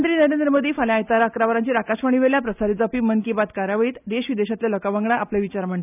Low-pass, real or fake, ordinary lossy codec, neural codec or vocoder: 3.6 kHz; real; none; none